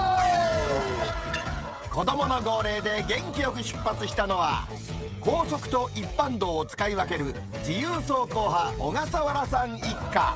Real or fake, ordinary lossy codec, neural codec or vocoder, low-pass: fake; none; codec, 16 kHz, 16 kbps, FreqCodec, smaller model; none